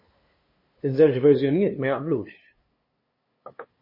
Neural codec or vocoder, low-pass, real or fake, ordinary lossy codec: codec, 16 kHz, 2 kbps, FunCodec, trained on LibriTTS, 25 frames a second; 5.4 kHz; fake; MP3, 24 kbps